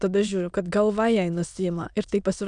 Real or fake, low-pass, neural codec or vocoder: fake; 9.9 kHz; autoencoder, 22.05 kHz, a latent of 192 numbers a frame, VITS, trained on many speakers